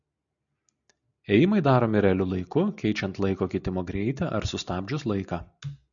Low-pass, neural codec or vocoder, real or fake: 7.2 kHz; none; real